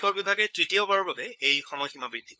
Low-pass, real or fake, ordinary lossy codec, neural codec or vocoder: none; fake; none; codec, 16 kHz, 4 kbps, FunCodec, trained on LibriTTS, 50 frames a second